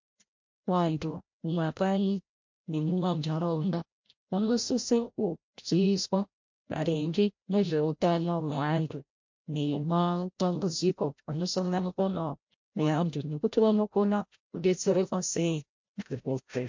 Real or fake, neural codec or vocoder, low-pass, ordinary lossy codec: fake; codec, 16 kHz, 0.5 kbps, FreqCodec, larger model; 7.2 kHz; MP3, 48 kbps